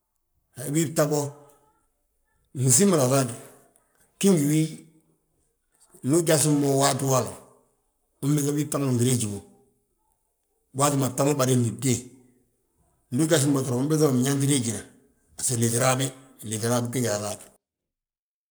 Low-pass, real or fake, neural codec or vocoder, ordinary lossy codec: none; fake; codec, 44.1 kHz, 7.8 kbps, Pupu-Codec; none